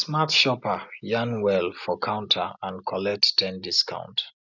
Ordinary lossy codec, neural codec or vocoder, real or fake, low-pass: none; none; real; 7.2 kHz